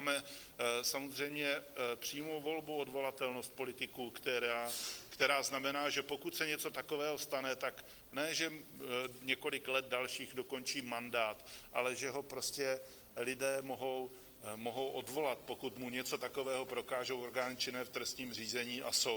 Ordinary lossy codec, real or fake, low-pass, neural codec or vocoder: Opus, 24 kbps; real; 19.8 kHz; none